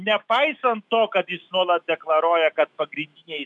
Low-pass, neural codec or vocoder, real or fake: 10.8 kHz; autoencoder, 48 kHz, 128 numbers a frame, DAC-VAE, trained on Japanese speech; fake